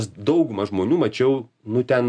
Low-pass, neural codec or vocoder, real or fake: 9.9 kHz; none; real